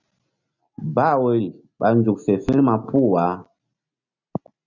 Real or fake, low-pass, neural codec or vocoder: real; 7.2 kHz; none